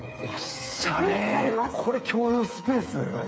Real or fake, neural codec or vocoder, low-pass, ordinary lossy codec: fake; codec, 16 kHz, 8 kbps, FreqCodec, smaller model; none; none